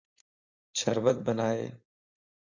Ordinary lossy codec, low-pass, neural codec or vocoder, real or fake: AAC, 48 kbps; 7.2 kHz; codec, 16 kHz, 4.8 kbps, FACodec; fake